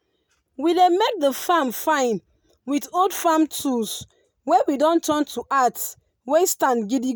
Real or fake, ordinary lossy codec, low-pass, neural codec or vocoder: real; none; none; none